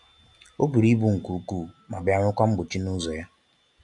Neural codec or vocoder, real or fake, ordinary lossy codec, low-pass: none; real; AAC, 64 kbps; 10.8 kHz